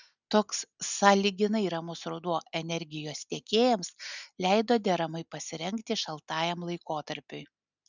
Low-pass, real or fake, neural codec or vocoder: 7.2 kHz; real; none